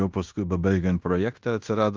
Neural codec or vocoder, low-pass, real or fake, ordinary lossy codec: codec, 16 kHz in and 24 kHz out, 0.9 kbps, LongCat-Audio-Codec, fine tuned four codebook decoder; 7.2 kHz; fake; Opus, 32 kbps